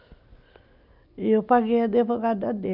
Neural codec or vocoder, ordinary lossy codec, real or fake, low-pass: none; none; real; 5.4 kHz